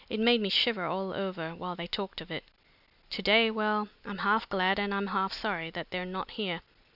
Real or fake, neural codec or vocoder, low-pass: real; none; 5.4 kHz